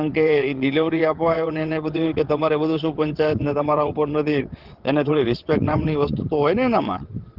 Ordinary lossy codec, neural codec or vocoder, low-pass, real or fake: Opus, 16 kbps; vocoder, 22.05 kHz, 80 mel bands, WaveNeXt; 5.4 kHz; fake